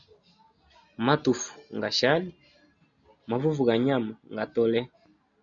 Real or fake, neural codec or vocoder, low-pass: real; none; 7.2 kHz